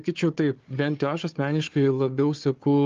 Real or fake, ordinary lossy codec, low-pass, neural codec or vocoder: fake; Opus, 32 kbps; 7.2 kHz; codec, 16 kHz, 4 kbps, FunCodec, trained on Chinese and English, 50 frames a second